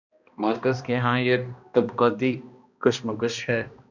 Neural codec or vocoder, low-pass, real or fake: codec, 16 kHz, 1 kbps, X-Codec, HuBERT features, trained on balanced general audio; 7.2 kHz; fake